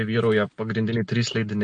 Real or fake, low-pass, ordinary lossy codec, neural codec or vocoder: real; 9.9 kHz; MP3, 48 kbps; none